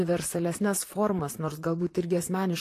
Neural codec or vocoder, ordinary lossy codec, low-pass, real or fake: vocoder, 44.1 kHz, 128 mel bands, Pupu-Vocoder; AAC, 48 kbps; 14.4 kHz; fake